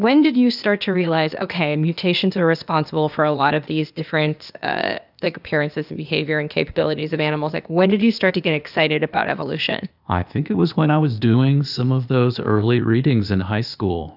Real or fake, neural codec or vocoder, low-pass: fake; codec, 16 kHz, 0.8 kbps, ZipCodec; 5.4 kHz